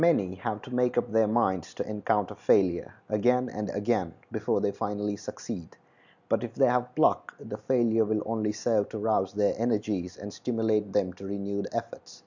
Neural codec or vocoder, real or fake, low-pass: none; real; 7.2 kHz